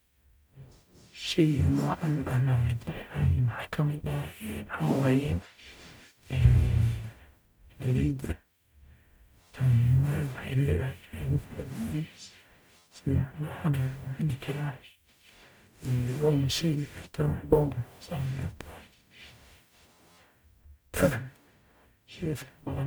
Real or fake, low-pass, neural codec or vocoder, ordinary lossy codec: fake; none; codec, 44.1 kHz, 0.9 kbps, DAC; none